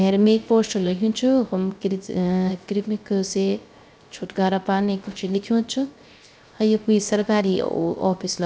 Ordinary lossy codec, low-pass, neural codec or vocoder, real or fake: none; none; codec, 16 kHz, 0.3 kbps, FocalCodec; fake